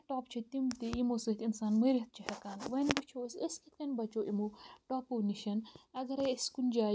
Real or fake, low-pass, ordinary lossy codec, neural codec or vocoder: real; none; none; none